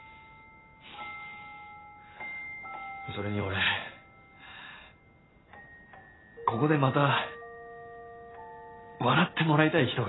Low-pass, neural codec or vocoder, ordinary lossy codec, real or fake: 7.2 kHz; none; AAC, 16 kbps; real